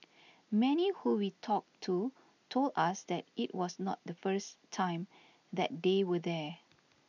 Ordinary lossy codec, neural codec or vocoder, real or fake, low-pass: none; none; real; 7.2 kHz